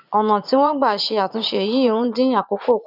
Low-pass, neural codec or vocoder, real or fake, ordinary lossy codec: 5.4 kHz; vocoder, 44.1 kHz, 80 mel bands, Vocos; fake; none